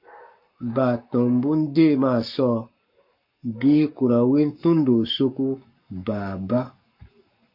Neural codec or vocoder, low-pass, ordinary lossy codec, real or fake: codec, 44.1 kHz, 7.8 kbps, Pupu-Codec; 5.4 kHz; MP3, 32 kbps; fake